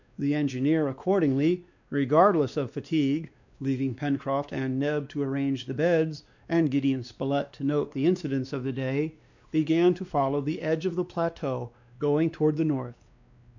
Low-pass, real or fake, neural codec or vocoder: 7.2 kHz; fake; codec, 16 kHz, 2 kbps, X-Codec, WavLM features, trained on Multilingual LibriSpeech